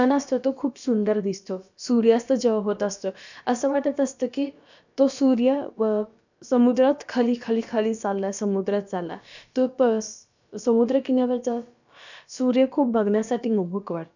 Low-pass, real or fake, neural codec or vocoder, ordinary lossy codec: 7.2 kHz; fake; codec, 16 kHz, about 1 kbps, DyCAST, with the encoder's durations; none